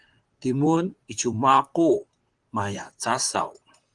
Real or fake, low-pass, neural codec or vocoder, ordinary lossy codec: fake; 10.8 kHz; vocoder, 44.1 kHz, 128 mel bands, Pupu-Vocoder; Opus, 24 kbps